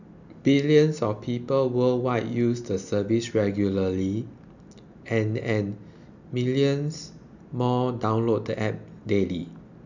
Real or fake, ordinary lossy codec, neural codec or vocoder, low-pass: real; none; none; 7.2 kHz